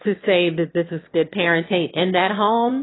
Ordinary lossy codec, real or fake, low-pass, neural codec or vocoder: AAC, 16 kbps; fake; 7.2 kHz; autoencoder, 22.05 kHz, a latent of 192 numbers a frame, VITS, trained on one speaker